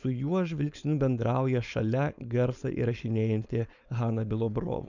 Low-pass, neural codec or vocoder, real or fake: 7.2 kHz; codec, 16 kHz, 4.8 kbps, FACodec; fake